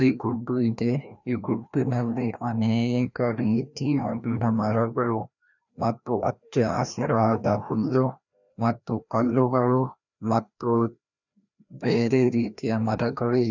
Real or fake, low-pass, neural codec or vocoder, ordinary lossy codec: fake; 7.2 kHz; codec, 16 kHz, 1 kbps, FreqCodec, larger model; none